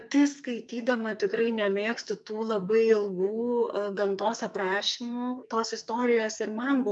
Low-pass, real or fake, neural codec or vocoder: 10.8 kHz; fake; codec, 44.1 kHz, 2.6 kbps, SNAC